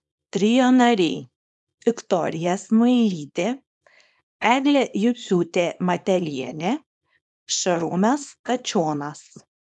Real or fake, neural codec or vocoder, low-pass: fake; codec, 24 kHz, 0.9 kbps, WavTokenizer, small release; 10.8 kHz